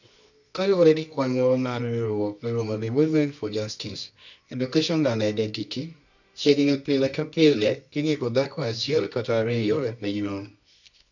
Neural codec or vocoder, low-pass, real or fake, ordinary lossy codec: codec, 24 kHz, 0.9 kbps, WavTokenizer, medium music audio release; 7.2 kHz; fake; none